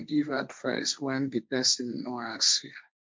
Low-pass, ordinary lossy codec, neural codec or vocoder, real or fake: none; none; codec, 16 kHz, 1.1 kbps, Voila-Tokenizer; fake